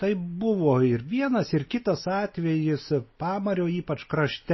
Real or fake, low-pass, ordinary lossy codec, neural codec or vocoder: real; 7.2 kHz; MP3, 24 kbps; none